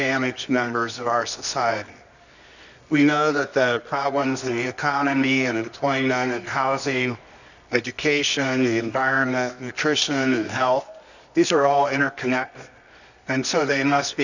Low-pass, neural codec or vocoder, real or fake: 7.2 kHz; codec, 24 kHz, 0.9 kbps, WavTokenizer, medium music audio release; fake